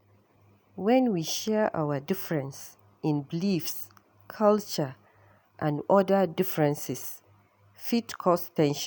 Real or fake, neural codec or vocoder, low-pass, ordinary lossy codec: real; none; none; none